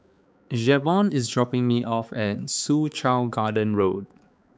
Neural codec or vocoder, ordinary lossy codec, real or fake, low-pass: codec, 16 kHz, 4 kbps, X-Codec, HuBERT features, trained on balanced general audio; none; fake; none